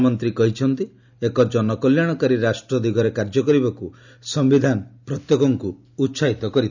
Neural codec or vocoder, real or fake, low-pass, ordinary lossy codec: none; real; 7.2 kHz; none